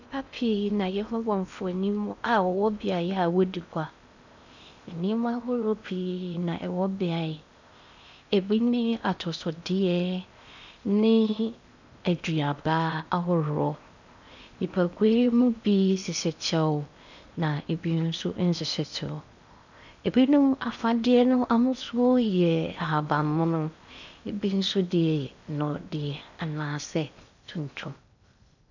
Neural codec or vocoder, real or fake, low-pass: codec, 16 kHz in and 24 kHz out, 0.8 kbps, FocalCodec, streaming, 65536 codes; fake; 7.2 kHz